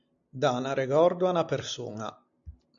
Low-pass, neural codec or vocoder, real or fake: 7.2 kHz; none; real